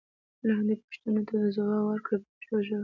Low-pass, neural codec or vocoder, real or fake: 7.2 kHz; none; real